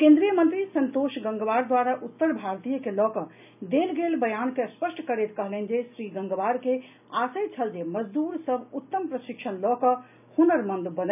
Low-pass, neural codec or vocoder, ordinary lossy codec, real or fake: 3.6 kHz; none; none; real